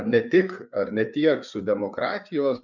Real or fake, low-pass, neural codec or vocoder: fake; 7.2 kHz; codec, 16 kHz, 4 kbps, FreqCodec, larger model